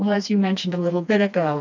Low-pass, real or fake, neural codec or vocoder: 7.2 kHz; fake; codec, 16 kHz, 2 kbps, FreqCodec, smaller model